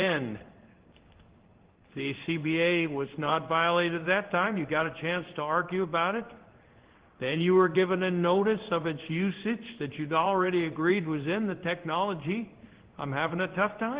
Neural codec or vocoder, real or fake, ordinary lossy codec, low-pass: codec, 16 kHz in and 24 kHz out, 1 kbps, XY-Tokenizer; fake; Opus, 16 kbps; 3.6 kHz